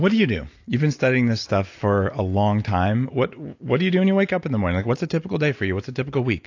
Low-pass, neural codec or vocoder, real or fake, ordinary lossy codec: 7.2 kHz; none; real; AAC, 48 kbps